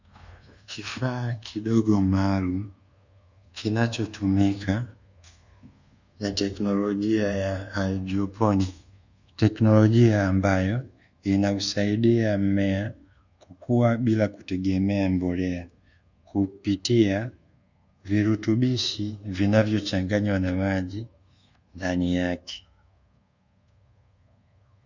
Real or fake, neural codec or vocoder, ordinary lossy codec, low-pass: fake; codec, 24 kHz, 1.2 kbps, DualCodec; Opus, 64 kbps; 7.2 kHz